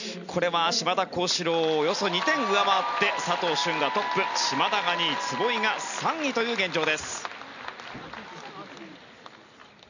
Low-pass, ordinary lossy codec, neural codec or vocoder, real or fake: 7.2 kHz; none; none; real